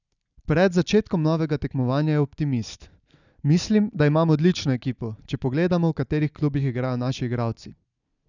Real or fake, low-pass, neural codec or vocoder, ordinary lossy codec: real; 7.2 kHz; none; none